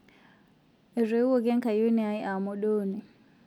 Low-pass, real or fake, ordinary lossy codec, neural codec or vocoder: 19.8 kHz; real; none; none